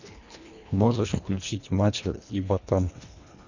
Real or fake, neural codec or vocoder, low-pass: fake; codec, 24 kHz, 1.5 kbps, HILCodec; 7.2 kHz